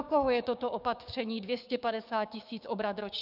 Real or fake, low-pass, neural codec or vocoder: fake; 5.4 kHz; codec, 16 kHz, 6 kbps, DAC